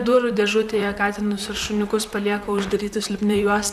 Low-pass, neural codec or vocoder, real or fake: 14.4 kHz; vocoder, 44.1 kHz, 128 mel bands, Pupu-Vocoder; fake